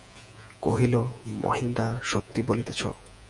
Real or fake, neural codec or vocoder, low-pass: fake; vocoder, 48 kHz, 128 mel bands, Vocos; 10.8 kHz